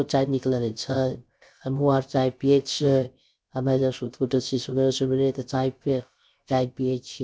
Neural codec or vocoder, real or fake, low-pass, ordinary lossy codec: codec, 16 kHz, 0.3 kbps, FocalCodec; fake; none; none